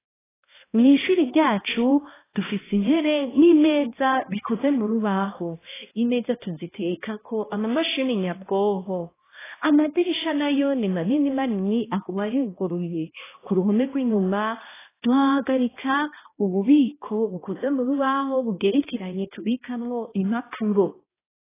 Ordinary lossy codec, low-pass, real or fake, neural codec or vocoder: AAC, 16 kbps; 3.6 kHz; fake; codec, 16 kHz, 1 kbps, X-Codec, HuBERT features, trained on balanced general audio